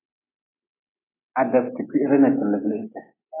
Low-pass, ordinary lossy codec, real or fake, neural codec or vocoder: 3.6 kHz; AAC, 16 kbps; real; none